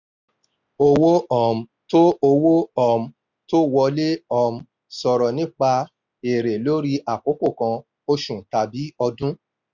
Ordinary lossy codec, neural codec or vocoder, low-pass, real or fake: none; none; 7.2 kHz; real